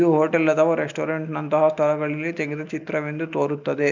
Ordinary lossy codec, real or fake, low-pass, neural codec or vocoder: none; real; 7.2 kHz; none